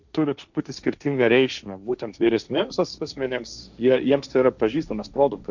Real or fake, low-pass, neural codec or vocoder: fake; 7.2 kHz; codec, 16 kHz, 1.1 kbps, Voila-Tokenizer